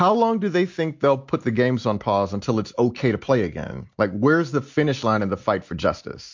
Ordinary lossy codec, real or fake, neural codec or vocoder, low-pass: MP3, 48 kbps; real; none; 7.2 kHz